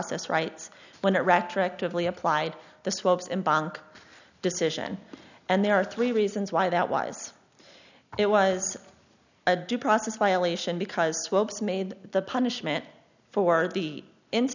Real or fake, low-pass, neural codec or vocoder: real; 7.2 kHz; none